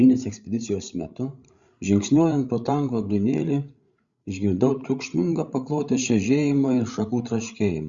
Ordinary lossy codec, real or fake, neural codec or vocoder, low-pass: Opus, 64 kbps; fake; codec, 16 kHz, 16 kbps, FreqCodec, larger model; 7.2 kHz